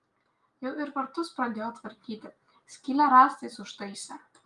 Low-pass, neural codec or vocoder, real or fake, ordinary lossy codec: 10.8 kHz; none; real; Opus, 32 kbps